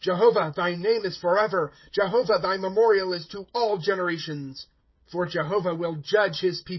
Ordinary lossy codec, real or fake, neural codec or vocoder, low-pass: MP3, 24 kbps; fake; codec, 16 kHz, 16 kbps, FreqCodec, smaller model; 7.2 kHz